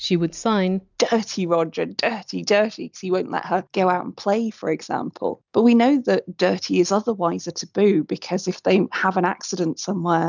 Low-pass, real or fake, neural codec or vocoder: 7.2 kHz; real; none